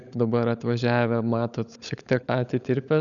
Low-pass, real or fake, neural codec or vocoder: 7.2 kHz; fake; codec, 16 kHz, 16 kbps, FunCodec, trained on LibriTTS, 50 frames a second